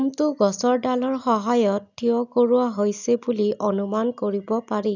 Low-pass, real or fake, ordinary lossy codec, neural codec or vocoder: 7.2 kHz; real; none; none